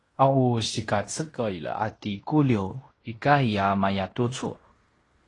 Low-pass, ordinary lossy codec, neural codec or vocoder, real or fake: 10.8 kHz; AAC, 32 kbps; codec, 16 kHz in and 24 kHz out, 0.9 kbps, LongCat-Audio-Codec, fine tuned four codebook decoder; fake